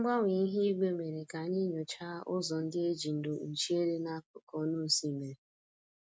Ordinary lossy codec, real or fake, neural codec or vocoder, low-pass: none; real; none; none